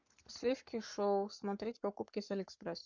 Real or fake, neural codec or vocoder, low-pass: fake; codec, 44.1 kHz, 7.8 kbps, Pupu-Codec; 7.2 kHz